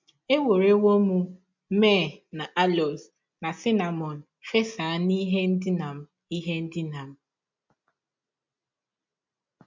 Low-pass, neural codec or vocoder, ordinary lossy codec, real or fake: 7.2 kHz; none; MP3, 64 kbps; real